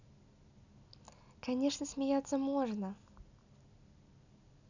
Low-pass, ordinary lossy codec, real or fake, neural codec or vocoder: 7.2 kHz; none; real; none